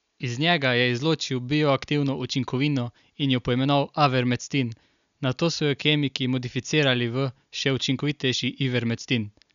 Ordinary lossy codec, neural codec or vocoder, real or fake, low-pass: none; none; real; 7.2 kHz